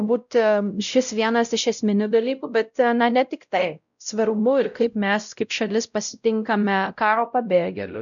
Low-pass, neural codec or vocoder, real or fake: 7.2 kHz; codec, 16 kHz, 0.5 kbps, X-Codec, WavLM features, trained on Multilingual LibriSpeech; fake